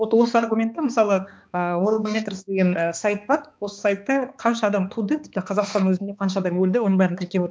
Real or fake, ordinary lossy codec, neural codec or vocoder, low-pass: fake; none; codec, 16 kHz, 2 kbps, X-Codec, HuBERT features, trained on balanced general audio; none